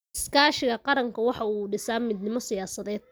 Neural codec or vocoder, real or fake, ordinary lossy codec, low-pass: none; real; none; none